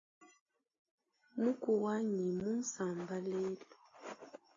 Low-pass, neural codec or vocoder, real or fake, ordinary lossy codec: 7.2 kHz; none; real; AAC, 32 kbps